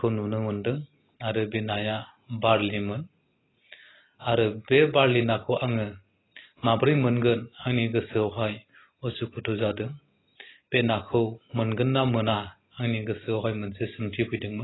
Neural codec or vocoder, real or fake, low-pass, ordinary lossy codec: none; real; 7.2 kHz; AAC, 16 kbps